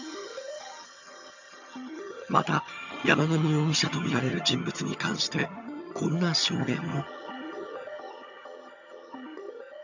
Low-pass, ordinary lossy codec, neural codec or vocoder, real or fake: 7.2 kHz; none; vocoder, 22.05 kHz, 80 mel bands, HiFi-GAN; fake